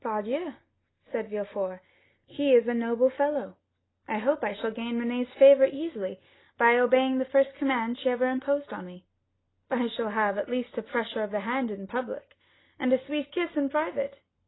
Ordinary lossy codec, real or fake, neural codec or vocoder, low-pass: AAC, 16 kbps; real; none; 7.2 kHz